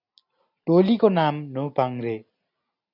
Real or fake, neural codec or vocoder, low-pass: real; none; 5.4 kHz